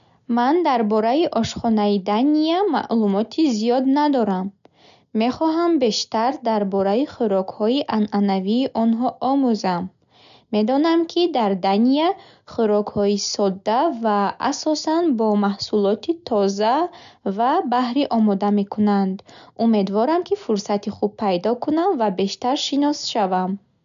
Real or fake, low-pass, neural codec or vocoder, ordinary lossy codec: real; 7.2 kHz; none; none